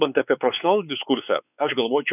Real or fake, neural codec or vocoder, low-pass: fake; codec, 16 kHz, 4 kbps, X-Codec, WavLM features, trained on Multilingual LibriSpeech; 3.6 kHz